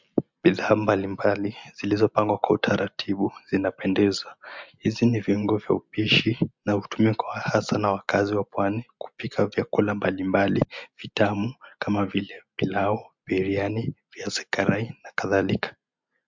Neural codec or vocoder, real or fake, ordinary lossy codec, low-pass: none; real; MP3, 64 kbps; 7.2 kHz